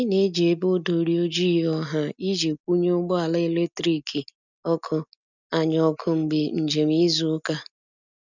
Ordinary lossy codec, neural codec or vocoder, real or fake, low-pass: none; none; real; 7.2 kHz